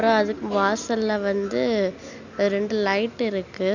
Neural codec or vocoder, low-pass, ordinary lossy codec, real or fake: none; 7.2 kHz; none; real